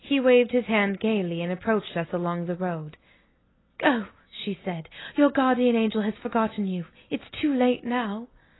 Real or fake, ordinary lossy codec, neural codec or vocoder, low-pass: real; AAC, 16 kbps; none; 7.2 kHz